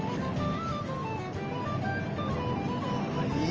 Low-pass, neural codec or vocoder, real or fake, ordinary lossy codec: 7.2 kHz; none; real; Opus, 24 kbps